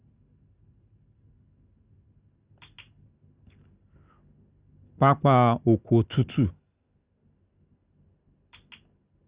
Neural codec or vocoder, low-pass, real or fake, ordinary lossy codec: none; 3.6 kHz; real; Opus, 64 kbps